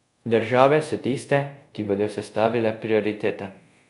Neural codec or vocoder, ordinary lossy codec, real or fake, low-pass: codec, 24 kHz, 0.5 kbps, DualCodec; none; fake; 10.8 kHz